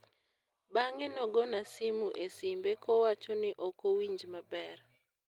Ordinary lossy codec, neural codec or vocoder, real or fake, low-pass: Opus, 32 kbps; none; real; 19.8 kHz